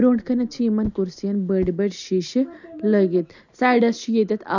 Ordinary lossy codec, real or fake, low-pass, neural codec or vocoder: none; real; 7.2 kHz; none